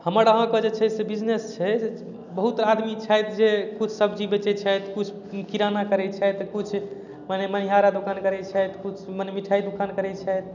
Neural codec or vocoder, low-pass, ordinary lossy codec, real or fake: none; 7.2 kHz; none; real